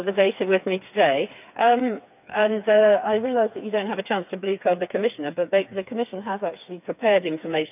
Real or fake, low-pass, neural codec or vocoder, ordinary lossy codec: fake; 3.6 kHz; codec, 16 kHz, 4 kbps, FreqCodec, smaller model; none